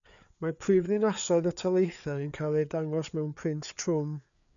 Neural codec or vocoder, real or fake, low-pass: codec, 16 kHz, 4 kbps, FreqCodec, larger model; fake; 7.2 kHz